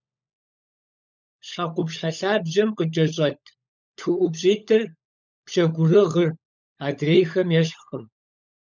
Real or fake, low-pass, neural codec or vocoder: fake; 7.2 kHz; codec, 16 kHz, 16 kbps, FunCodec, trained on LibriTTS, 50 frames a second